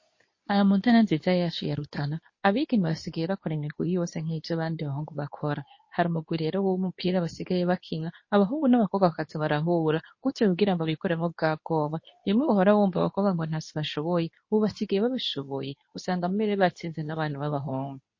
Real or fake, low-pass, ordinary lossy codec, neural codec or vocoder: fake; 7.2 kHz; MP3, 32 kbps; codec, 24 kHz, 0.9 kbps, WavTokenizer, medium speech release version 2